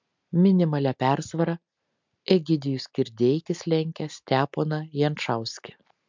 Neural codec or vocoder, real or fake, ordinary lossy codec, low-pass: none; real; MP3, 64 kbps; 7.2 kHz